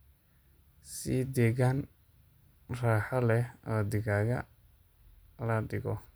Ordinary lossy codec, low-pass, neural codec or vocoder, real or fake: none; none; none; real